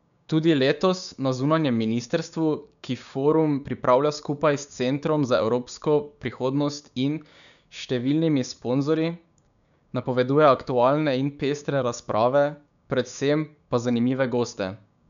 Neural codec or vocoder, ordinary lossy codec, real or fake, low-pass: codec, 16 kHz, 6 kbps, DAC; none; fake; 7.2 kHz